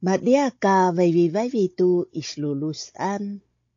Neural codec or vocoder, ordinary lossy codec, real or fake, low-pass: codec, 16 kHz, 16 kbps, FunCodec, trained on Chinese and English, 50 frames a second; AAC, 48 kbps; fake; 7.2 kHz